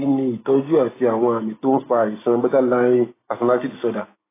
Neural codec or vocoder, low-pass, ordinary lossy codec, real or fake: none; 3.6 kHz; AAC, 16 kbps; real